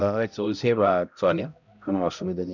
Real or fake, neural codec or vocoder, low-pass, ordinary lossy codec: fake; codec, 16 kHz, 0.5 kbps, X-Codec, HuBERT features, trained on balanced general audio; 7.2 kHz; none